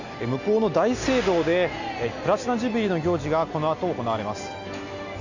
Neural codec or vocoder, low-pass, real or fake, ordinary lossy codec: none; 7.2 kHz; real; none